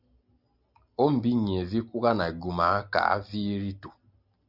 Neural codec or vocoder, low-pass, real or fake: none; 5.4 kHz; real